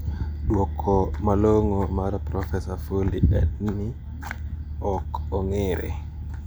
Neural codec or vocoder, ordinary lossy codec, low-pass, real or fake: none; none; none; real